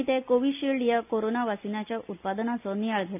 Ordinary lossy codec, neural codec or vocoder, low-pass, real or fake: AAC, 32 kbps; none; 3.6 kHz; real